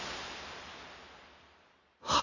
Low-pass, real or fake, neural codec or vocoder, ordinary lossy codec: 7.2 kHz; real; none; none